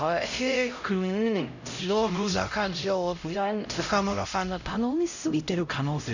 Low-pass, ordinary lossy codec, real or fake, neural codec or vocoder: 7.2 kHz; none; fake; codec, 16 kHz, 0.5 kbps, X-Codec, HuBERT features, trained on LibriSpeech